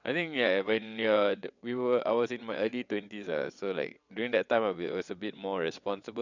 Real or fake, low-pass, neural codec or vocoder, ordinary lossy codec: fake; 7.2 kHz; vocoder, 22.05 kHz, 80 mel bands, WaveNeXt; none